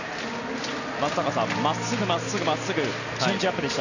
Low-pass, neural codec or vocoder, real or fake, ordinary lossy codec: 7.2 kHz; none; real; none